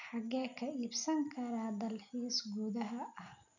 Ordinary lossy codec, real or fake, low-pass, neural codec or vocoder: none; real; 7.2 kHz; none